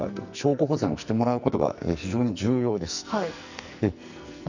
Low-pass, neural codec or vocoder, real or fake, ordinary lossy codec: 7.2 kHz; codec, 44.1 kHz, 2.6 kbps, SNAC; fake; none